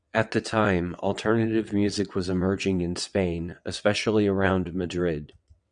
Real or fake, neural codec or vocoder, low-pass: fake; vocoder, 22.05 kHz, 80 mel bands, WaveNeXt; 9.9 kHz